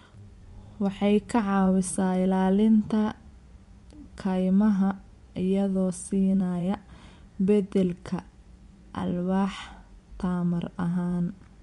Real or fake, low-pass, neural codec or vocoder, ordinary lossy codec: fake; 10.8 kHz; vocoder, 24 kHz, 100 mel bands, Vocos; MP3, 64 kbps